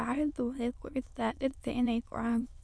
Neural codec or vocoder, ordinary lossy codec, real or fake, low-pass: autoencoder, 22.05 kHz, a latent of 192 numbers a frame, VITS, trained on many speakers; none; fake; none